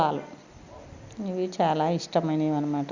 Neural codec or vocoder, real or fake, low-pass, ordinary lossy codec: none; real; 7.2 kHz; Opus, 64 kbps